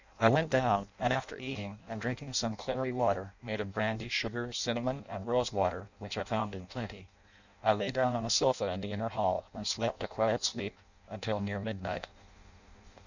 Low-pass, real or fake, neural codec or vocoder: 7.2 kHz; fake; codec, 16 kHz in and 24 kHz out, 0.6 kbps, FireRedTTS-2 codec